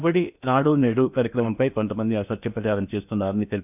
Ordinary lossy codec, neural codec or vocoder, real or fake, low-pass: none; codec, 16 kHz in and 24 kHz out, 0.8 kbps, FocalCodec, streaming, 65536 codes; fake; 3.6 kHz